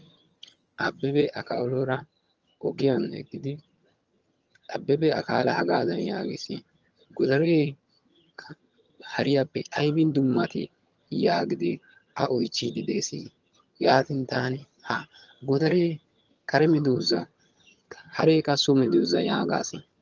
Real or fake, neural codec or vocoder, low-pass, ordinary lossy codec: fake; vocoder, 22.05 kHz, 80 mel bands, HiFi-GAN; 7.2 kHz; Opus, 24 kbps